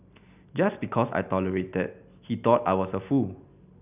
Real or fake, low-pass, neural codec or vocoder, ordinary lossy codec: real; 3.6 kHz; none; none